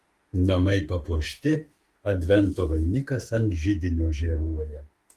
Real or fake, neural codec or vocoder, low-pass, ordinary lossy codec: fake; autoencoder, 48 kHz, 32 numbers a frame, DAC-VAE, trained on Japanese speech; 14.4 kHz; Opus, 24 kbps